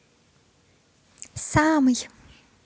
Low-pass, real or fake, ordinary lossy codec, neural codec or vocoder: none; real; none; none